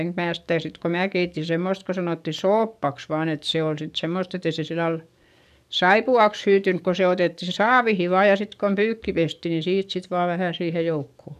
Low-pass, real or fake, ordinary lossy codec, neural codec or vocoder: 14.4 kHz; fake; none; codec, 44.1 kHz, 7.8 kbps, DAC